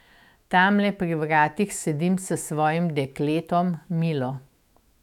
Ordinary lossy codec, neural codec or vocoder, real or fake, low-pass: none; autoencoder, 48 kHz, 128 numbers a frame, DAC-VAE, trained on Japanese speech; fake; 19.8 kHz